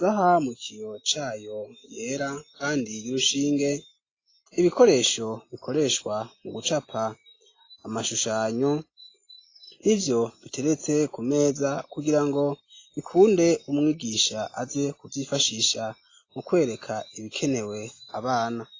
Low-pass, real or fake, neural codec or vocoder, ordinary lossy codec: 7.2 kHz; real; none; AAC, 32 kbps